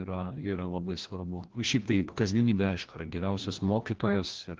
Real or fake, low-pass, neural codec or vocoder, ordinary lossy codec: fake; 7.2 kHz; codec, 16 kHz, 1 kbps, FreqCodec, larger model; Opus, 16 kbps